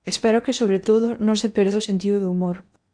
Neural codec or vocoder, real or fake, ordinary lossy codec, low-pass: codec, 16 kHz in and 24 kHz out, 0.8 kbps, FocalCodec, streaming, 65536 codes; fake; MP3, 96 kbps; 9.9 kHz